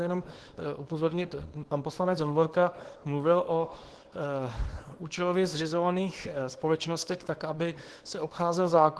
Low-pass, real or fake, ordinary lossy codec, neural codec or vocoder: 10.8 kHz; fake; Opus, 16 kbps; codec, 24 kHz, 0.9 kbps, WavTokenizer, small release